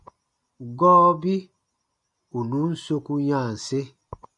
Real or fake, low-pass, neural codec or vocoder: real; 10.8 kHz; none